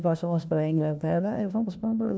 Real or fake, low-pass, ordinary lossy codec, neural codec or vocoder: fake; none; none; codec, 16 kHz, 1 kbps, FunCodec, trained on LibriTTS, 50 frames a second